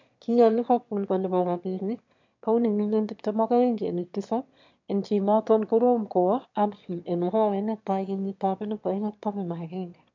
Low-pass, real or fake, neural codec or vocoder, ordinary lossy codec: 7.2 kHz; fake; autoencoder, 22.05 kHz, a latent of 192 numbers a frame, VITS, trained on one speaker; MP3, 64 kbps